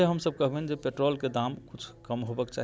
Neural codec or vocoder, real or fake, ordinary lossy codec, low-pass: none; real; none; none